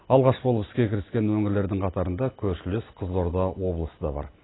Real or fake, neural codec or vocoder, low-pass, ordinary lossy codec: real; none; 7.2 kHz; AAC, 16 kbps